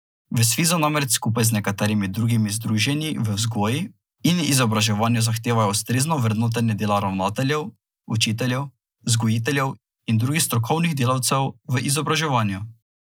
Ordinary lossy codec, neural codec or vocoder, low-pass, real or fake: none; none; none; real